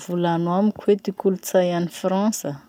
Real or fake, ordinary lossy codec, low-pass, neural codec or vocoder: real; none; 19.8 kHz; none